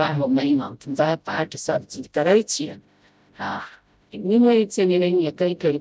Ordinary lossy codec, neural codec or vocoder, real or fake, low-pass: none; codec, 16 kHz, 0.5 kbps, FreqCodec, smaller model; fake; none